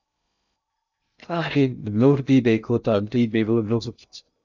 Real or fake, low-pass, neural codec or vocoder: fake; 7.2 kHz; codec, 16 kHz in and 24 kHz out, 0.6 kbps, FocalCodec, streaming, 2048 codes